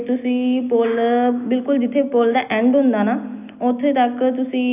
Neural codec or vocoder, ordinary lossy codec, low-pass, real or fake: none; AAC, 32 kbps; 3.6 kHz; real